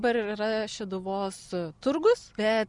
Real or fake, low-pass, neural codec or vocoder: real; 10.8 kHz; none